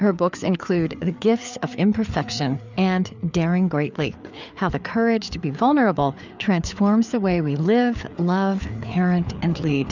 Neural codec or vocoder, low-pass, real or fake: codec, 16 kHz, 4 kbps, FreqCodec, larger model; 7.2 kHz; fake